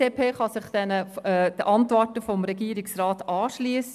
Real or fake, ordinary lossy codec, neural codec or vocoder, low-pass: real; none; none; 14.4 kHz